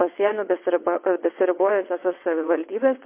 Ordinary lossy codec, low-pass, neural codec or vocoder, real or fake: MP3, 24 kbps; 3.6 kHz; vocoder, 22.05 kHz, 80 mel bands, WaveNeXt; fake